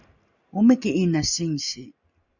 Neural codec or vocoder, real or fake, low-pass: none; real; 7.2 kHz